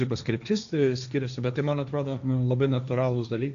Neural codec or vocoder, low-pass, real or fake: codec, 16 kHz, 1.1 kbps, Voila-Tokenizer; 7.2 kHz; fake